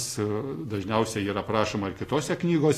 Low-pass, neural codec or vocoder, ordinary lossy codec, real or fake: 14.4 kHz; none; AAC, 48 kbps; real